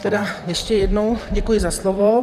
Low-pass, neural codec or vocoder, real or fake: 14.4 kHz; vocoder, 44.1 kHz, 128 mel bands, Pupu-Vocoder; fake